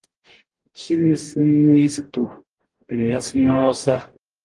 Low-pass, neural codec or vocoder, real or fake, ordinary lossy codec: 10.8 kHz; codec, 44.1 kHz, 0.9 kbps, DAC; fake; Opus, 24 kbps